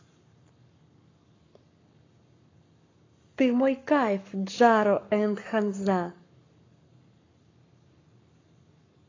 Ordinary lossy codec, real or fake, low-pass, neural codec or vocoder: AAC, 32 kbps; fake; 7.2 kHz; codec, 44.1 kHz, 7.8 kbps, Pupu-Codec